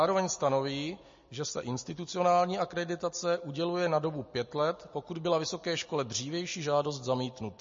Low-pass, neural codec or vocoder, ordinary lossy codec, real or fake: 7.2 kHz; none; MP3, 32 kbps; real